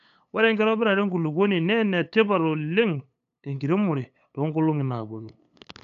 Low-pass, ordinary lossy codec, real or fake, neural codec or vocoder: 7.2 kHz; none; fake; codec, 16 kHz, 8 kbps, FunCodec, trained on LibriTTS, 25 frames a second